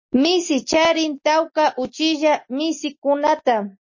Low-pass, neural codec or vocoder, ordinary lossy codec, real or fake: 7.2 kHz; none; MP3, 32 kbps; real